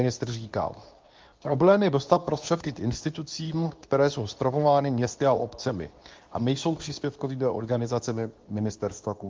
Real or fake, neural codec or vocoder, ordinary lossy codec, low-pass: fake; codec, 24 kHz, 0.9 kbps, WavTokenizer, medium speech release version 2; Opus, 32 kbps; 7.2 kHz